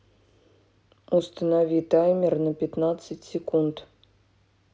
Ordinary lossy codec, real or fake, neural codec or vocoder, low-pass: none; real; none; none